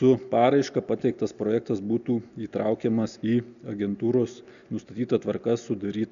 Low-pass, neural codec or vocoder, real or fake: 7.2 kHz; none; real